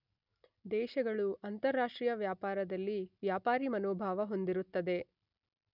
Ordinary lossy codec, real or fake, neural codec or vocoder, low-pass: none; real; none; 5.4 kHz